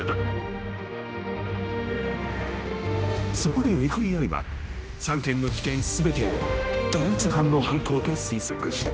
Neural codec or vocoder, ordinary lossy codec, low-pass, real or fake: codec, 16 kHz, 1 kbps, X-Codec, HuBERT features, trained on general audio; none; none; fake